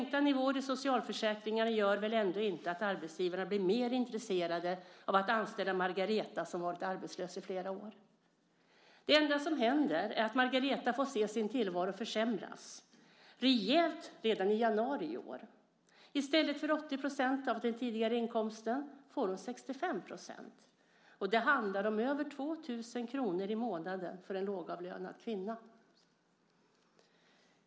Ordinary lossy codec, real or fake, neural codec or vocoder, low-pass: none; real; none; none